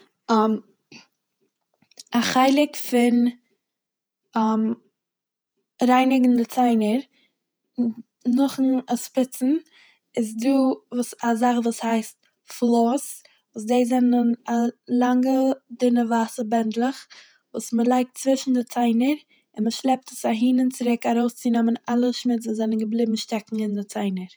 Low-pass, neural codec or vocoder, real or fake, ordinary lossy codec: none; vocoder, 48 kHz, 128 mel bands, Vocos; fake; none